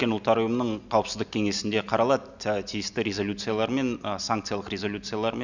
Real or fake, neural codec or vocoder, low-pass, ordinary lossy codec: real; none; 7.2 kHz; none